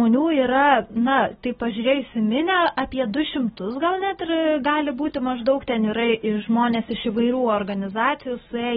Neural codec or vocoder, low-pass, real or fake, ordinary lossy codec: none; 19.8 kHz; real; AAC, 16 kbps